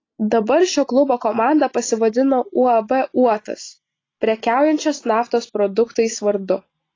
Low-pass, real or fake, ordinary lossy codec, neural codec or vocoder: 7.2 kHz; real; AAC, 32 kbps; none